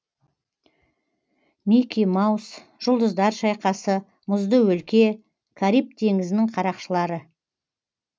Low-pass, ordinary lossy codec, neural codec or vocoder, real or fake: none; none; none; real